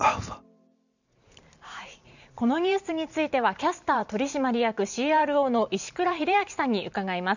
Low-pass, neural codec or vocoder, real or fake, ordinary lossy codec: 7.2 kHz; vocoder, 44.1 kHz, 128 mel bands every 512 samples, BigVGAN v2; fake; none